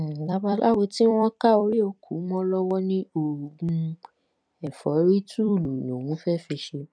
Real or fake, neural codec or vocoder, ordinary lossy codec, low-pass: fake; vocoder, 44.1 kHz, 128 mel bands every 256 samples, BigVGAN v2; none; 9.9 kHz